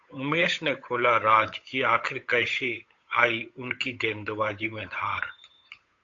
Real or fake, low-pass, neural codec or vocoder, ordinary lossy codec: fake; 7.2 kHz; codec, 16 kHz, 8 kbps, FunCodec, trained on Chinese and English, 25 frames a second; AAC, 48 kbps